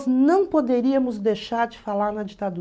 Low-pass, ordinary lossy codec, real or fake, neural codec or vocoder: none; none; real; none